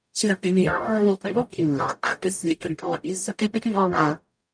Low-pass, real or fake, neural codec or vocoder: 9.9 kHz; fake; codec, 44.1 kHz, 0.9 kbps, DAC